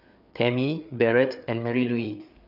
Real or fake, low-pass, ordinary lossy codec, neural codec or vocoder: fake; 5.4 kHz; none; codec, 16 kHz, 4 kbps, FreqCodec, larger model